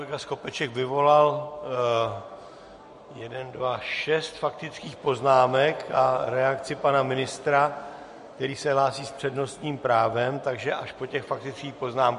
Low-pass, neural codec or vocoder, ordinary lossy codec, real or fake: 14.4 kHz; none; MP3, 48 kbps; real